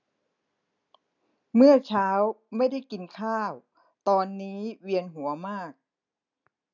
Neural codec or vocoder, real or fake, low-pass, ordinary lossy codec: none; real; 7.2 kHz; none